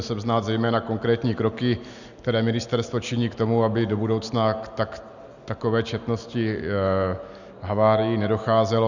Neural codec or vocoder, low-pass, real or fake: none; 7.2 kHz; real